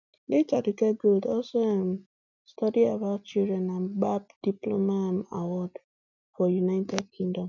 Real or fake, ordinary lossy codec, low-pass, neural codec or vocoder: real; none; 7.2 kHz; none